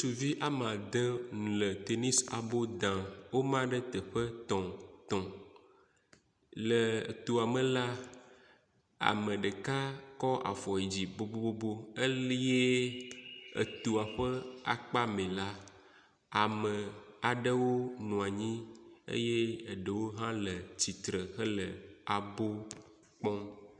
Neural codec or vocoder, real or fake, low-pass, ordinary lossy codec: none; real; 9.9 kHz; MP3, 96 kbps